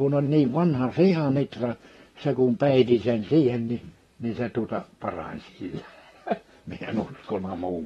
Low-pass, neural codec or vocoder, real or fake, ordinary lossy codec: 19.8 kHz; vocoder, 44.1 kHz, 128 mel bands, Pupu-Vocoder; fake; AAC, 32 kbps